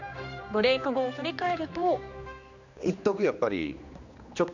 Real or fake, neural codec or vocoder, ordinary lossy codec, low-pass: fake; codec, 16 kHz, 2 kbps, X-Codec, HuBERT features, trained on general audio; none; 7.2 kHz